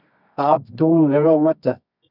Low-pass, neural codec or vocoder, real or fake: 5.4 kHz; codec, 24 kHz, 0.9 kbps, WavTokenizer, medium music audio release; fake